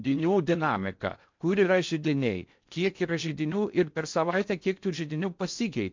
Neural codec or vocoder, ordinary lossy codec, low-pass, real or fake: codec, 16 kHz in and 24 kHz out, 0.6 kbps, FocalCodec, streaming, 4096 codes; MP3, 64 kbps; 7.2 kHz; fake